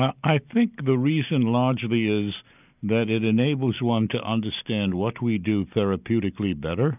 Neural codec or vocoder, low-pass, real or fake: none; 3.6 kHz; real